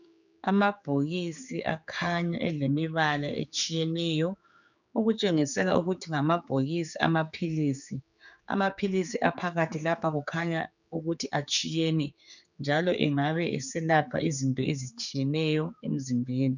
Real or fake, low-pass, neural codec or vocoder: fake; 7.2 kHz; codec, 16 kHz, 4 kbps, X-Codec, HuBERT features, trained on general audio